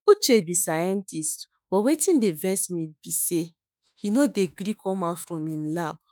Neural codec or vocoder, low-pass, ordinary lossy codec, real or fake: autoencoder, 48 kHz, 32 numbers a frame, DAC-VAE, trained on Japanese speech; none; none; fake